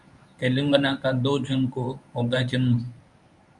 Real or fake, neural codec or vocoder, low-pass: fake; codec, 24 kHz, 0.9 kbps, WavTokenizer, medium speech release version 1; 10.8 kHz